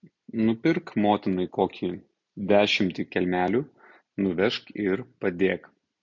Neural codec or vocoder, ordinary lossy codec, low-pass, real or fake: none; MP3, 48 kbps; 7.2 kHz; real